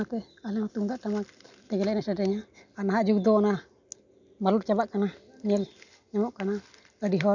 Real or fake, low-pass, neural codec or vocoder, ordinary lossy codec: real; 7.2 kHz; none; none